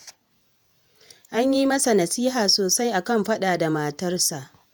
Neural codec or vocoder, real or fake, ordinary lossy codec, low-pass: vocoder, 48 kHz, 128 mel bands, Vocos; fake; none; none